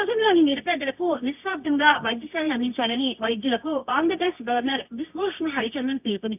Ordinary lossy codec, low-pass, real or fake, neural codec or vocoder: none; 3.6 kHz; fake; codec, 24 kHz, 0.9 kbps, WavTokenizer, medium music audio release